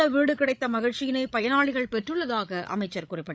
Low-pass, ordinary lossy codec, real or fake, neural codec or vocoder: none; none; fake; codec, 16 kHz, 16 kbps, FreqCodec, larger model